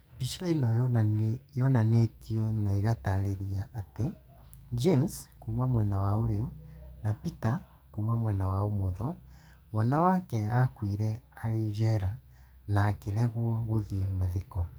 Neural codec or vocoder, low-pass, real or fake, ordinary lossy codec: codec, 44.1 kHz, 2.6 kbps, SNAC; none; fake; none